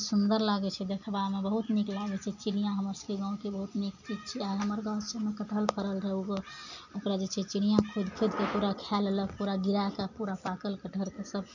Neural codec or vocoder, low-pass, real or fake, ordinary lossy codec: none; 7.2 kHz; real; none